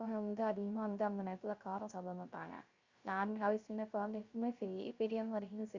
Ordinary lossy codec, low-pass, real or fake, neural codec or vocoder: Opus, 64 kbps; 7.2 kHz; fake; codec, 16 kHz, 0.3 kbps, FocalCodec